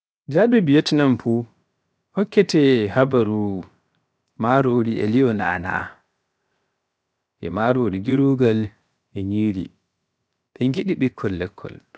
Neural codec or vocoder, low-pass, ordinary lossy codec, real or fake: codec, 16 kHz, 0.7 kbps, FocalCodec; none; none; fake